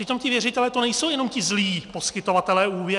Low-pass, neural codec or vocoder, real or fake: 10.8 kHz; none; real